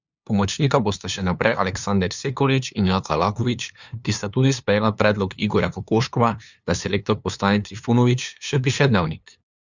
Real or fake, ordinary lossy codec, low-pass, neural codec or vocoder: fake; Opus, 64 kbps; 7.2 kHz; codec, 16 kHz, 2 kbps, FunCodec, trained on LibriTTS, 25 frames a second